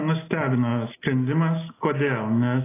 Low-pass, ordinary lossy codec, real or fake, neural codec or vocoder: 3.6 kHz; AAC, 16 kbps; real; none